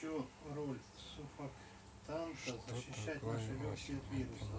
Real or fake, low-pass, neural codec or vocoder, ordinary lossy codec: real; none; none; none